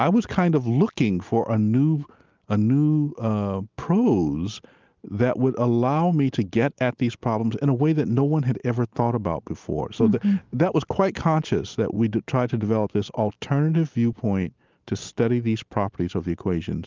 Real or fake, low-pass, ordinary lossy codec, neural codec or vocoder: real; 7.2 kHz; Opus, 32 kbps; none